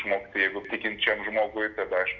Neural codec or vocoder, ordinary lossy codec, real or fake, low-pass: none; AAC, 48 kbps; real; 7.2 kHz